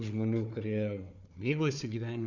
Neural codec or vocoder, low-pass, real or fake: codec, 16 kHz, 4 kbps, FunCodec, trained on Chinese and English, 50 frames a second; 7.2 kHz; fake